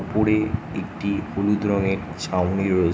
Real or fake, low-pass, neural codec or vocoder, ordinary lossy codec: real; none; none; none